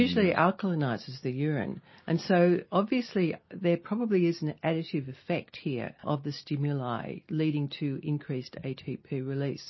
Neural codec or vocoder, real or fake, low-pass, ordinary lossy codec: none; real; 7.2 kHz; MP3, 24 kbps